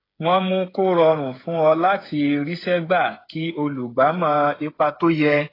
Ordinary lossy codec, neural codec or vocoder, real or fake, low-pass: AAC, 24 kbps; codec, 16 kHz, 8 kbps, FreqCodec, smaller model; fake; 5.4 kHz